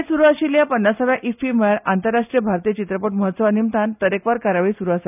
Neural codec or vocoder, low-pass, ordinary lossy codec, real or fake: none; 3.6 kHz; none; real